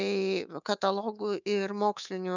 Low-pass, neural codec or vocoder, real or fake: 7.2 kHz; codec, 24 kHz, 3.1 kbps, DualCodec; fake